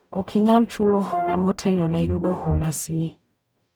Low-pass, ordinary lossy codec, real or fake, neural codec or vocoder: none; none; fake; codec, 44.1 kHz, 0.9 kbps, DAC